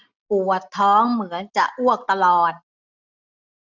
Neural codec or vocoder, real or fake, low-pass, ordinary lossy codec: none; real; 7.2 kHz; none